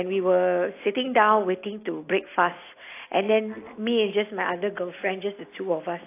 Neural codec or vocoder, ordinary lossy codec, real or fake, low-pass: none; AAC, 24 kbps; real; 3.6 kHz